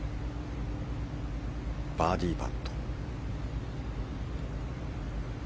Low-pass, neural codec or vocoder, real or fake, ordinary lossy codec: none; none; real; none